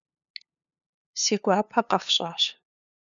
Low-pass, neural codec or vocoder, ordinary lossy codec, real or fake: 7.2 kHz; codec, 16 kHz, 2 kbps, FunCodec, trained on LibriTTS, 25 frames a second; MP3, 96 kbps; fake